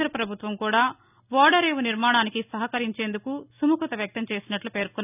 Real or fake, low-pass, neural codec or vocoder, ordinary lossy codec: real; 3.6 kHz; none; none